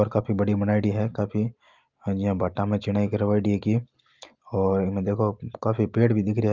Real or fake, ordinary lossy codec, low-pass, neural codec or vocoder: real; Opus, 32 kbps; 7.2 kHz; none